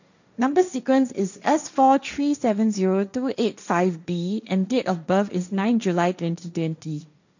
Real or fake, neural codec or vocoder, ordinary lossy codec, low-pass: fake; codec, 16 kHz, 1.1 kbps, Voila-Tokenizer; none; 7.2 kHz